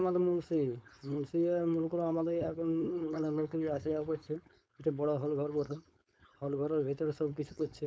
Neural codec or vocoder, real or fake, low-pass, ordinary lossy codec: codec, 16 kHz, 4.8 kbps, FACodec; fake; none; none